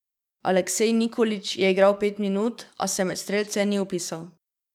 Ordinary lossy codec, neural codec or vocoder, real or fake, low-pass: none; codec, 44.1 kHz, 7.8 kbps, DAC; fake; 19.8 kHz